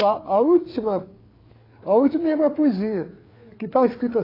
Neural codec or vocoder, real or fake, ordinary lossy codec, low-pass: codec, 16 kHz, 4 kbps, FreqCodec, larger model; fake; AAC, 24 kbps; 5.4 kHz